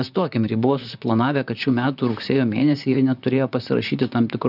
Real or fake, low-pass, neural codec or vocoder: fake; 5.4 kHz; vocoder, 44.1 kHz, 128 mel bands, Pupu-Vocoder